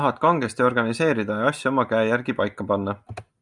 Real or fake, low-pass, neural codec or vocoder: real; 10.8 kHz; none